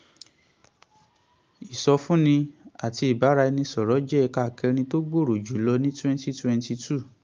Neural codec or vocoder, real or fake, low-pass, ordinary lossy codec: none; real; 7.2 kHz; Opus, 32 kbps